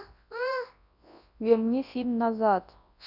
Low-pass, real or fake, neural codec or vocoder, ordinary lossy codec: 5.4 kHz; fake; codec, 24 kHz, 0.9 kbps, WavTokenizer, large speech release; none